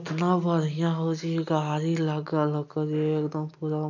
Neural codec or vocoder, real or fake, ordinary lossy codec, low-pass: none; real; none; 7.2 kHz